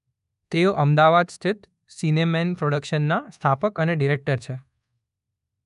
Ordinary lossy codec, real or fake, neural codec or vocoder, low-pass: none; fake; codec, 24 kHz, 1.2 kbps, DualCodec; 10.8 kHz